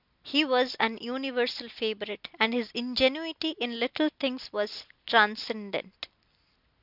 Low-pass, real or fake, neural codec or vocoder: 5.4 kHz; real; none